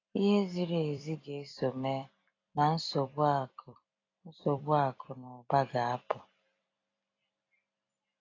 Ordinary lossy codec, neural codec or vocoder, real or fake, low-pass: AAC, 32 kbps; vocoder, 44.1 kHz, 128 mel bands every 256 samples, BigVGAN v2; fake; 7.2 kHz